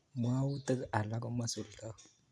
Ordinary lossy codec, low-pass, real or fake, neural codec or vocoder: none; none; real; none